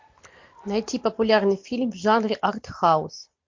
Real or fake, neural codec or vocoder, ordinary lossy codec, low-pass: real; none; MP3, 48 kbps; 7.2 kHz